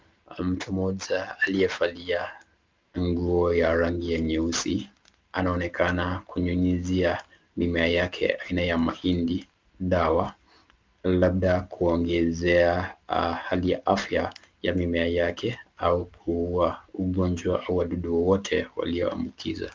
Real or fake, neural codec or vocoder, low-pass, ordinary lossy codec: real; none; 7.2 kHz; Opus, 32 kbps